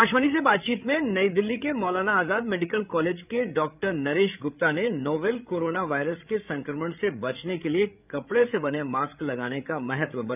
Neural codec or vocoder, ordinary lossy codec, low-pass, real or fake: codec, 16 kHz, 8 kbps, FreqCodec, larger model; none; 3.6 kHz; fake